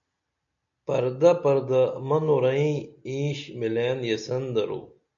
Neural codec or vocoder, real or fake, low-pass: none; real; 7.2 kHz